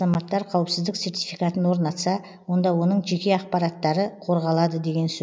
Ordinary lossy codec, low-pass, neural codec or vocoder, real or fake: none; none; none; real